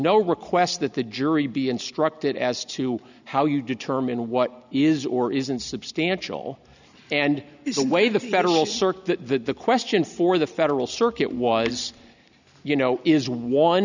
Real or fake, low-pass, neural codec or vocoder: real; 7.2 kHz; none